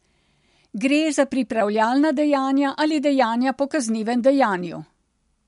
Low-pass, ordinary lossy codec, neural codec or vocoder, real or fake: 10.8 kHz; MP3, 64 kbps; none; real